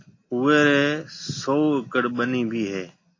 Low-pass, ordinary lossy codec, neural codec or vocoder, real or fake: 7.2 kHz; AAC, 32 kbps; none; real